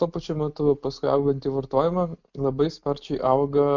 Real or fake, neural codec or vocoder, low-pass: real; none; 7.2 kHz